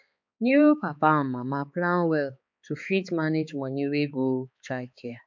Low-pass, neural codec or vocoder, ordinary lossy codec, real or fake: 7.2 kHz; codec, 16 kHz, 4 kbps, X-Codec, HuBERT features, trained on balanced general audio; MP3, 64 kbps; fake